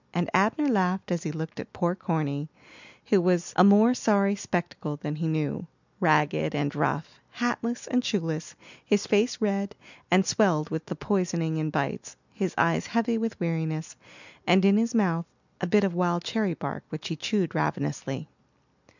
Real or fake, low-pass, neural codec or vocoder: real; 7.2 kHz; none